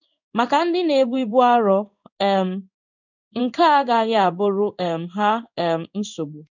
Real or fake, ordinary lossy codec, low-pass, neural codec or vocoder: fake; none; 7.2 kHz; codec, 16 kHz in and 24 kHz out, 1 kbps, XY-Tokenizer